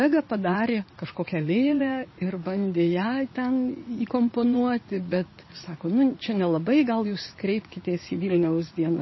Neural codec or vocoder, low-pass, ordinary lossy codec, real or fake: vocoder, 44.1 kHz, 80 mel bands, Vocos; 7.2 kHz; MP3, 24 kbps; fake